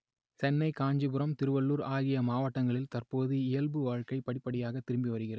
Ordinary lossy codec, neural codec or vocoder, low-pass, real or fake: none; none; none; real